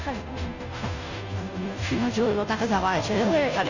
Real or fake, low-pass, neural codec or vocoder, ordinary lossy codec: fake; 7.2 kHz; codec, 16 kHz, 0.5 kbps, FunCodec, trained on Chinese and English, 25 frames a second; none